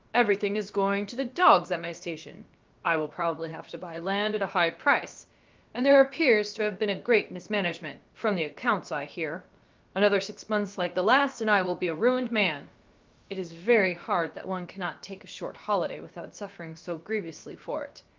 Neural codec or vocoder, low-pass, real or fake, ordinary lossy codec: codec, 16 kHz, about 1 kbps, DyCAST, with the encoder's durations; 7.2 kHz; fake; Opus, 32 kbps